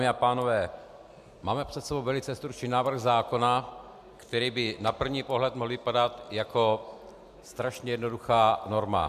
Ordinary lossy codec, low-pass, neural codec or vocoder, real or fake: MP3, 96 kbps; 14.4 kHz; none; real